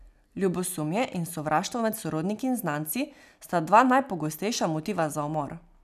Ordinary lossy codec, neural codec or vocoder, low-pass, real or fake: none; none; 14.4 kHz; real